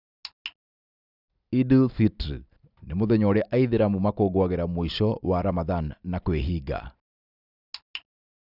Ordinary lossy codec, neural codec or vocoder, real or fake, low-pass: none; none; real; 5.4 kHz